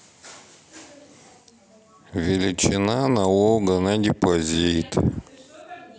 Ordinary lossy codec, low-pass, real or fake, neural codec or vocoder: none; none; real; none